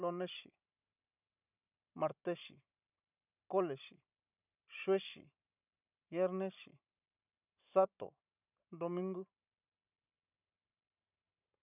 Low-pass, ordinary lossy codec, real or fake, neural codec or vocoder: 3.6 kHz; none; fake; vocoder, 44.1 kHz, 128 mel bands every 512 samples, BigVGAN v2